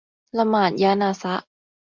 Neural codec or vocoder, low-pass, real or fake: none; 7.2 kHz; real